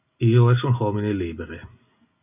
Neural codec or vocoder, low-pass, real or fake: none; 3.6 kHz; real